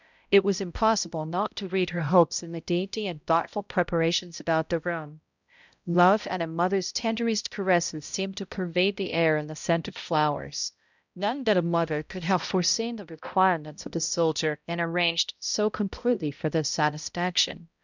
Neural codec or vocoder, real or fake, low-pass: codec, 16 kHz, 0.5 kbps, X-Codec, HuBERT features, trained on balanced general audio; fake; 7.2 kHz